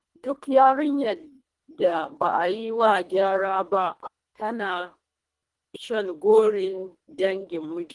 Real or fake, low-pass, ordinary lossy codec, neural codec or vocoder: fake; 10.8 kHz; Opus, 24 kbps; codec, 24 kHz, 1.5 kbps, HILCodec